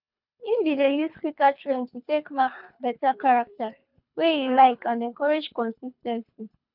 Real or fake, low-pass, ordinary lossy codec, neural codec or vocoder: fake; 5.4 kHz; none; codec, 24 kHz, 3 kbps, HILCodec